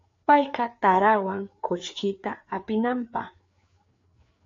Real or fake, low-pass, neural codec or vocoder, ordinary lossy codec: fake; 7.2 kHz; codec, 16 kHz, 4 kbps, FreqCodec, larger model; AAC, 48 kbps